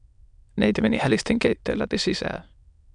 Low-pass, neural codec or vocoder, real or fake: 9.9 kHz; autoencoder, 22.05 kHz, a latent of 192 numbers a frame, VITS, trained on many speakers; fake